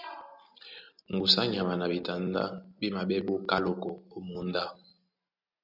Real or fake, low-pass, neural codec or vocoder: real; 5.4 kHz; none